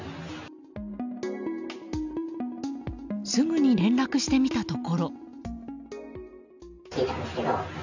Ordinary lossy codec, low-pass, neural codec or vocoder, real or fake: none; 7.2 kHz; none; real